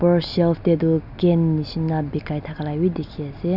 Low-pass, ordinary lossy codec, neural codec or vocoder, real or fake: 5.4 kHz; none; none; real